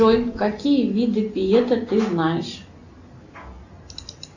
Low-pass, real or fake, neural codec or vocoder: 7.2 kHz; real; none